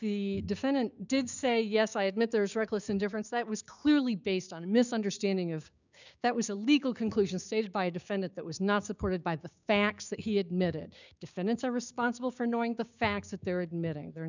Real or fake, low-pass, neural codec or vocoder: fake; 7.2 kHz; codec, 16 kHz, 6 kbps, DAC